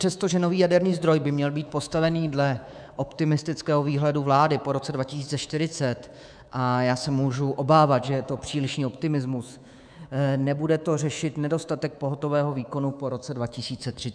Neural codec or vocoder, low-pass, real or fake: codec, 24 kHz, 3.1 kbps, DualCodec; 9.9 kHz; fake